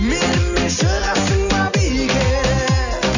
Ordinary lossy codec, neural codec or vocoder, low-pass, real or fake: none; none; 7.2 kHz; real